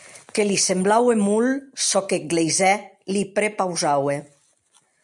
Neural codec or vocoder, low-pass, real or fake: none; 10.8 kHz; real